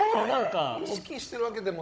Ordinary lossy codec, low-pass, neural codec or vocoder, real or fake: none; none; codec, 16 kHz, 16 kbps, FunCodec, trained on Chinese and English, 50 frames a second; fake